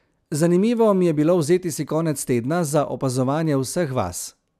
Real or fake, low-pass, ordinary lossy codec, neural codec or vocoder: real; 14.4 kHz; none; none